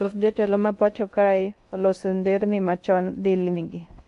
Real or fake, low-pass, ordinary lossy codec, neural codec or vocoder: fake; 10.8 kHz; AAC, 64 kbps; codec, 16 kHz in and 24 kHz out, 0.6 kbps, FocalCodec, streaming, 2048 codes